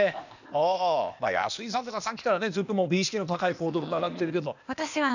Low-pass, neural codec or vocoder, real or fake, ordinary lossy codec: 7.2 kHz; codec, 16 kHz, 0.8 kbps, ZipCodec; fake; none